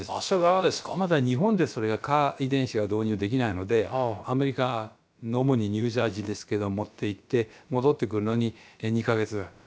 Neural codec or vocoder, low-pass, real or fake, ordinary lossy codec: codec, 16 kHz, about 1 kbps, DyCAST, with the encoder's durations; none; fake; none